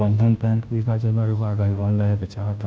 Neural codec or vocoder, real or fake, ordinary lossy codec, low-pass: codec, 16 kHz, 0.5 kbps, FunCodec, trained on Chinese and English, 25 frames a second; fake; none; none